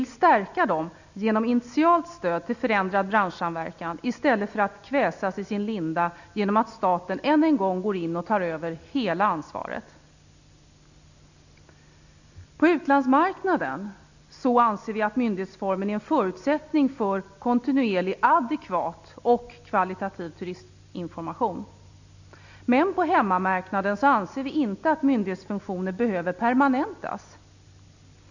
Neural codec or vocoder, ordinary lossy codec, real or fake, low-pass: none; none; real; 7.2 kHz